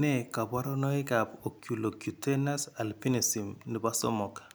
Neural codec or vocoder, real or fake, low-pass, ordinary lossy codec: none; real; none; none